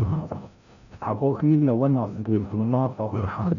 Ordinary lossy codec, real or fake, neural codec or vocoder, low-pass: none; fake; codec, 16 kHz, 0.5 kbps, FreqCodec, larger model; 7.2 kHz